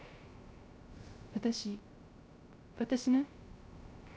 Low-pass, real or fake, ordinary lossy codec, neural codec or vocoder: none; fake; none; codec, 16 kHz, 0.3 kbps, FocalCodec